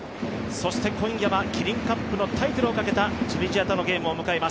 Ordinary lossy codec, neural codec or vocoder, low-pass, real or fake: none; none; none; real